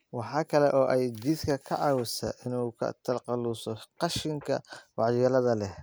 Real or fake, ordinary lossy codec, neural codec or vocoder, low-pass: real; none; none; none